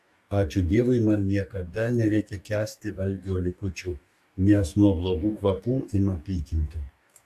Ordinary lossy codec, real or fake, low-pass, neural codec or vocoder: AAC, 96 kbps; fake; 14.4 kHz; codec, 44.1 kHz, 2.6 kbps, DAC